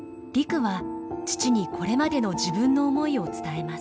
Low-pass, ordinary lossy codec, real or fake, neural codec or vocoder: none; none; real; none